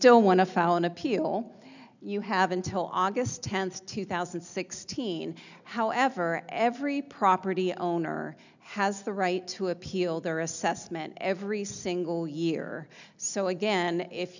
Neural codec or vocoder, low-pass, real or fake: none; 7.2 kHz; real